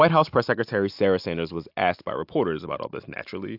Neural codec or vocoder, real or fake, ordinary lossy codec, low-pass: none; real; AAC, 48 kbps; 5.4 kHz